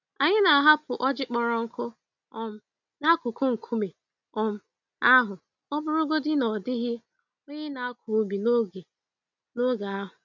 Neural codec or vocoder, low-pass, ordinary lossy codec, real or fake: none; 7.2 kHz; none; real